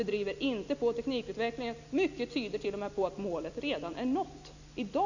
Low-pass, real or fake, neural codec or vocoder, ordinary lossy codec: 7.2 kHz; real; none; none